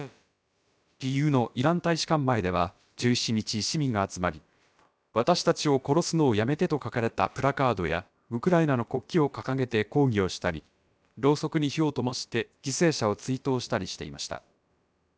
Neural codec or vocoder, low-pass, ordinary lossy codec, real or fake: codec, 16 kHz, about 1 kbps, DyCAST, with the encoder's durations; none; none; fake